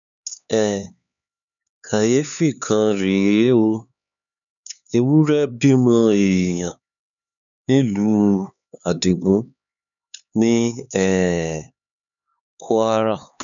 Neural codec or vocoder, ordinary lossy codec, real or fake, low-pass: codec, 16 kHz, 4 kbps, X-Codec, HuBERT features, trained on LibriSpeech; none; fake; 7.2 kHz